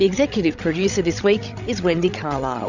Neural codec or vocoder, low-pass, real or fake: codec, 16 kHz, 16 kbps, FreqCodec, larger model; 7.2 kHz; fake